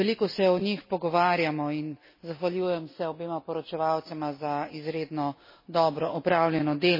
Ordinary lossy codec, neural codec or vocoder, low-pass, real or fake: MP3, 24 kbps; none; 5.4 kHz; real